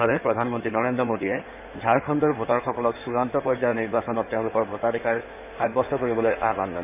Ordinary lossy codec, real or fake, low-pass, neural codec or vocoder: none; fake; 3.6 kHz; codec, 16 kHz in and 24 kHz out, 2.2 kbps, FireRedTTS-2 codec